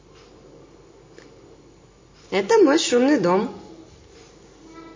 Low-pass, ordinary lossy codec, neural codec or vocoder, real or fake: 7.2 kHz; MP3, 32 kbps; none; real